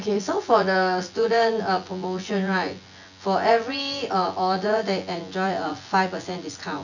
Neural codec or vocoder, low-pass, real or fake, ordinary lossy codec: vocoder, 24 kHz, 100 mel bands, Vocos; 7.2 kHz; fake; none